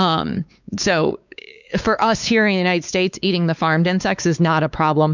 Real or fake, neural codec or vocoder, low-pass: fake; codec, 16 kHz, 4 kbps, X-Codec, WavLM features, trained on Multilingual LibriSpeech; 7.2 kHz